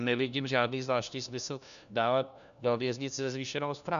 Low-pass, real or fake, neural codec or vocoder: 7.2 kHz; fake; codec, 16 kHz, 1 kbps, FunCodec, trained on LibriTTS, 50 frames a second